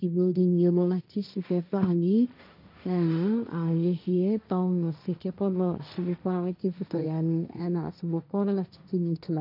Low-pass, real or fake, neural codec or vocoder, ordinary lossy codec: 5.4 kHz; fake; codec, 16 kHz, 1.1 kbps, Voila-Tokenizer; none